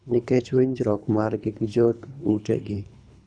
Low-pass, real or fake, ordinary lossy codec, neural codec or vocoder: 9.9 kHz; fake; none; codec, 24 kHz, 3 kbps, HILCodec